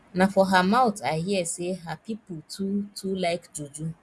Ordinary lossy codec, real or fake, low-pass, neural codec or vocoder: none; real; none; none